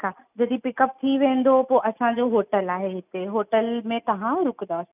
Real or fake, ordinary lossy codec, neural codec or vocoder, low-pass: real; none; none; 3.6 kHz